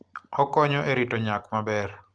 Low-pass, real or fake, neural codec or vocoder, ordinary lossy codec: 9.9 kHz; real; none; Opus, 24 kbps